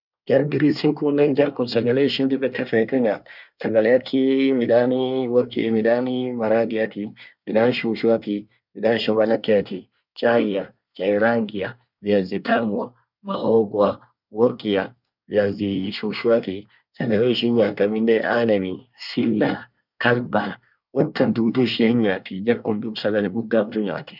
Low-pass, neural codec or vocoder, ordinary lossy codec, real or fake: 5.4 kHz; codec, 24 kHz, 1 kbps, SNAC; none; fake